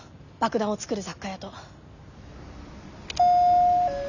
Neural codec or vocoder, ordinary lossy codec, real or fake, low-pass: none; none; real; 7.2 kHz